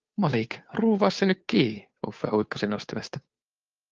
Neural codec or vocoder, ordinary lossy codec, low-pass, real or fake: codec, 16 kHz, 2 kbps, FunCodec, trained on Chinese and English, 25 frames a second; Opus, 24 kbps; 7.2 kHz; fake